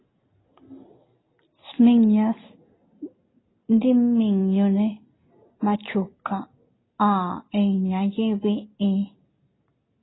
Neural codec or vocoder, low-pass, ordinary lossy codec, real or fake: none; 7.2 kHz; AAC, 16 kbps; real